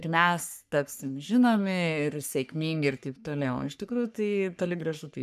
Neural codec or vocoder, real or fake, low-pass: codec, 44.1 kHz, 3.4 kbps, Pupu-Codec; fake; 14.4 kHz